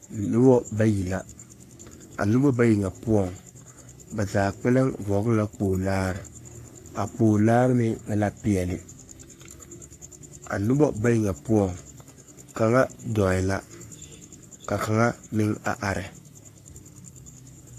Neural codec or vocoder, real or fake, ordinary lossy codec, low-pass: codec, 44.1 kHz, 3.4 kbps, Pupu-Codec; fake; AAC, 64 kbps; 14.4 kHz